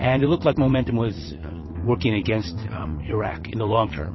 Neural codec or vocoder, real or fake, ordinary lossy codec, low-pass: vocoder, 22.05 kHz, 80 mel bands, WaveNeXt; fake; MP3, 24 kbps; 7.2 kHz